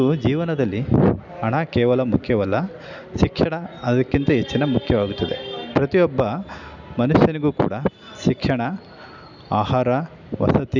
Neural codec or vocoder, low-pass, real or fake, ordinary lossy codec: none; 7.2 kHz; real; none